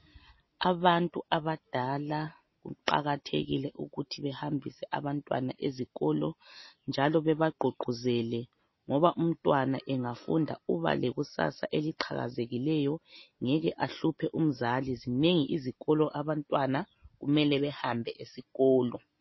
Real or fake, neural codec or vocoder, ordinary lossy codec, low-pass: real; none; MP3, 24 kbps; 7.2 kHz